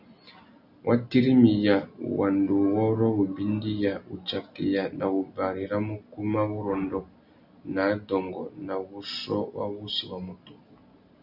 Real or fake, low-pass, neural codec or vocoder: real; 5.4 kHz; none